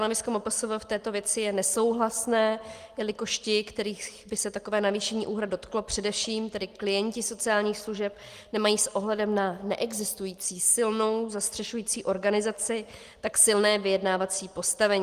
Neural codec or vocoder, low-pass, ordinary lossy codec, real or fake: none; 14.4 kHz; Opus, 24 kbps; real